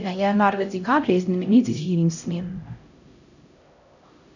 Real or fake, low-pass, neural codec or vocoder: fake; 7.2 kHz; codec, 16 kHz, 0.5 kbps, X-Codec, HuBERT features, trained on LibriSpeech